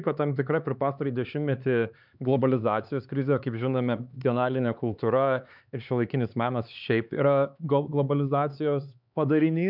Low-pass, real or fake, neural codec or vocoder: 5.4 kHz; fake; codec, 16 kHz, 4 kbps, X-Codec, HuBERT features, trained on LibriSpeech